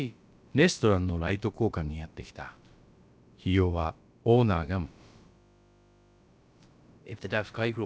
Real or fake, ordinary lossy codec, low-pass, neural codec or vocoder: fake; none; none; codec, 16 kHz, about 1 kbps, DyCAST, with the encoder's durations